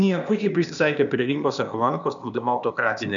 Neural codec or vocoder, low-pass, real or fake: codec, 16 kHz, 0.8 kbps, ZipCodec; 7.2 kHz; fake